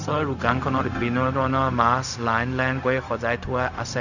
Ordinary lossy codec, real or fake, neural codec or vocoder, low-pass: none; fake; codec, 16 kHz, 0.4 kbps, LongCat-Audio-Codec; 7.2 kHz